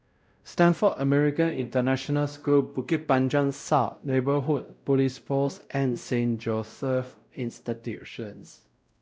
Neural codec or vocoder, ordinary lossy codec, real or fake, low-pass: codec, 16 kHz, 0.5 kbps, X-Codec, WavLM features, trained on Multilingual LibriSpeech; none; fake; none